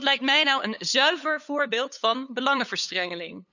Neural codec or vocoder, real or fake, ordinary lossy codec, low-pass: codec, 16 kHz, 8 kbps, FunCodec, trained on LibriTTS, 25 frames a second; fake; none; 7.2 kHz